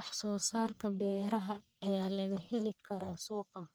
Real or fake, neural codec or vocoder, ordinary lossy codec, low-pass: fake; codec, 44.1 kHz, 1.7 kbps, Pupu-Codec; none; none